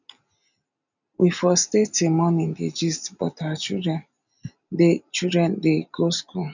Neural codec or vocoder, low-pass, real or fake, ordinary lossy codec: none; 7.2 kHz; real; none